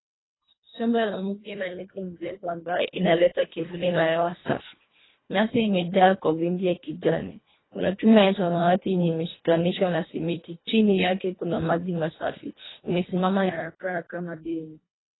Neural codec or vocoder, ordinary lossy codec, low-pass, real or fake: codec, 24 kHz, 1.5 kbps, HILCodec; AAC, 16 kbps; 7.2 kHz; fake